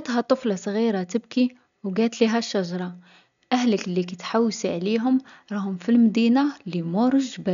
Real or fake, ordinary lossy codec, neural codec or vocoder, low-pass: real; none; none; 7.2 kHz